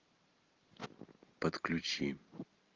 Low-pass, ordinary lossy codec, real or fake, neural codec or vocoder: 7.2 kHz; Opus, 24 kbps; real; none